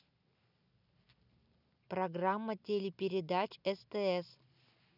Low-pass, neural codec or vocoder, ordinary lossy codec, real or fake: 5.4 kHz; none; none; real